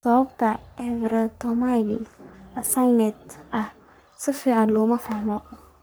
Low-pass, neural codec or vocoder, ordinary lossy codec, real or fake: none; codec, 44.1 kHz, 3.4 kbps, Pupu-Codec; none; fake